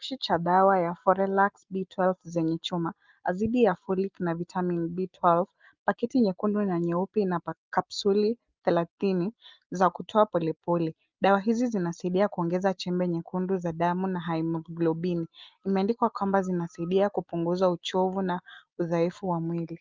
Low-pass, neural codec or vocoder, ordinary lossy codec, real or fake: 7.2 kHz; none; Opus, 32 kbps; real